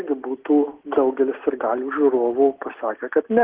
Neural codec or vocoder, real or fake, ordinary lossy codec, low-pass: none; real; Opus, 16 kbps; 3.6 kHz